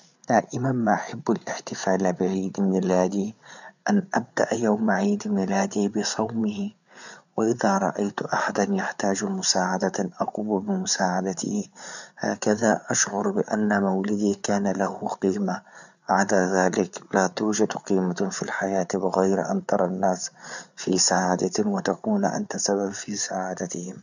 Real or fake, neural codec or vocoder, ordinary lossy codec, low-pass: fake; codec, 16 kHz, 8 kbps, FreqCodec, larger model; none; 7.2 kHz